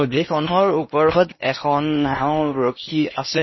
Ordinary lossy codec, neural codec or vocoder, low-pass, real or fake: MP3, 24 kbps; codec, 16 kHz in and 24 kHz out, 0.8 kbps, FocalCodec, streaming, 65536 codes; 7.2 kHz; fake